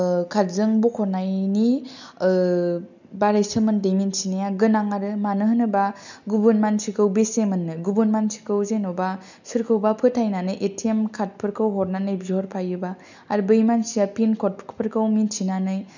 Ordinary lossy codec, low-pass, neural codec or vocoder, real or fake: none; 7.2 kHz; none; real